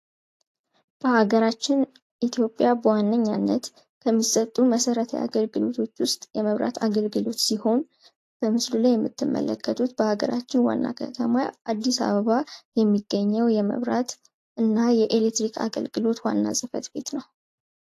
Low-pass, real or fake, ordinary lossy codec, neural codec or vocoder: 14.4 kHz; real; AAC, 64 kbps; none